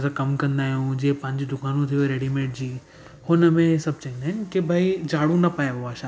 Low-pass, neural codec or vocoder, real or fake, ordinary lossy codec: none; none; real; none